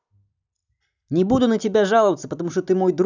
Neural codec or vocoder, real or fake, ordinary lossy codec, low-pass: none; real; none; 7.2 kHz